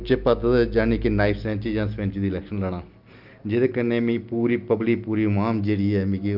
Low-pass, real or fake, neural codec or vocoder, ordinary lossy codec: 5.4 kHz; real; none; Opus, 24 kbps